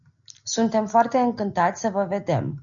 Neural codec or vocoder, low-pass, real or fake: none; 7.2 kHz; real